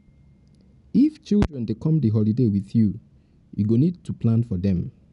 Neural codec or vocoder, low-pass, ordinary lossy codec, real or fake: none; 10.8 kHz; none; real